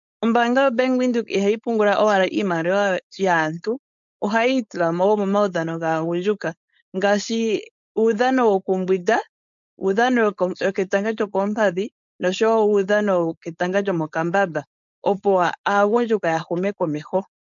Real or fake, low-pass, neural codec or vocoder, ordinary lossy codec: fake; 7.2 kHz; codec, 16 kHz, 4.8 kbps, FACodec; MP3, 64 kbps